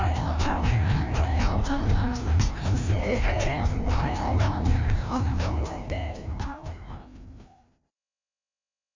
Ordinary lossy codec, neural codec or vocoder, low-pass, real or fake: MP3, 64 kbps; codec, 16 kHz, 1 kbps, FreqCodec, larger model; 7.2 kHz; fake